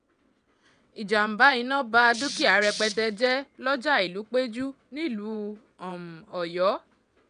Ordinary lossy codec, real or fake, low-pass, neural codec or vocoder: none; fake; 9.9 kHz; vocoder, 22.05 kHz, 80 mel bands, WaveNeXt